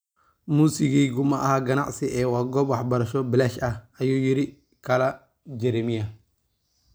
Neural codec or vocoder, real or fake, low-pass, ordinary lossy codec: none; real; none; none